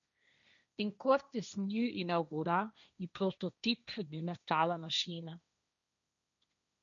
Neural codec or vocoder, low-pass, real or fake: codec, 16 kHz, 1.1 kbps, Voila-Tokenizer; 7.2 kHz; fake